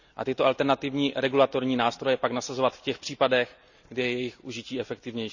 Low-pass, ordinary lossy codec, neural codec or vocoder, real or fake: 7.2 kHz; none; none; real